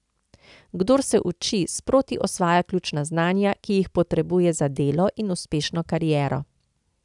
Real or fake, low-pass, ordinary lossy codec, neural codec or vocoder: real; 10.8 kHz; none; none